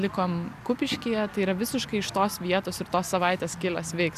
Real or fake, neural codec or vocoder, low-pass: real; none; 14.4 kHz